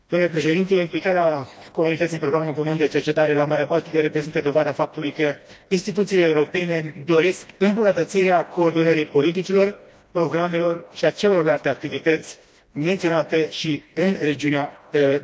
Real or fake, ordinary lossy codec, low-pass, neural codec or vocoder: fake; none; none; codec, 16 kHz, 1 kbps, FreqCodec, smaller model